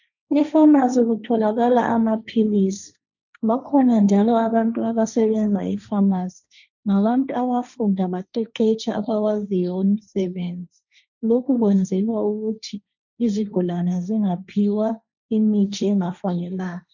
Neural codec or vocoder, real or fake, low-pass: codec, 16 kHz, 1.1 kbps, Voila-Tokenizer; fake; 7.2 kHz